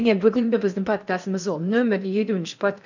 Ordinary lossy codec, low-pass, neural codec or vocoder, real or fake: none; 7.2 kHz; codec, 16 kHz in and 24 kHz out, 0.6 kbps, FocalCodec, streaming, 4096 codes; fake